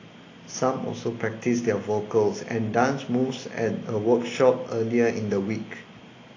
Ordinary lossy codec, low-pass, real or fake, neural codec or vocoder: AAC, 32 kbps; 7.2 kHz; real; none